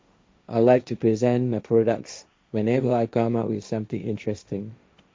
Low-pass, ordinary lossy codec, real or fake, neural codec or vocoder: none; none; fake; codec, 16 kHz, 1.1 kbps, Voila-Tokenizer